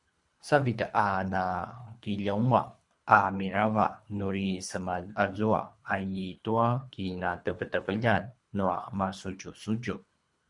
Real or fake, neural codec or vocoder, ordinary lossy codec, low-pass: fake; codec, 24 kHz, 3 kbps, HILCodec; MP3, 64 kbps; 10.8 kHz